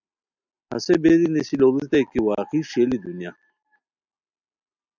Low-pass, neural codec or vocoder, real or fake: 7.2 kHz; none; real